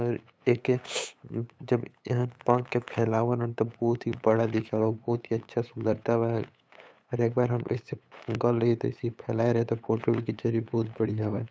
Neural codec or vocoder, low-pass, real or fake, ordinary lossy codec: codec, 16 kHz, 8 kbps, FunCodec, trained on LibriTTS, 25 frames a second; none; fake; none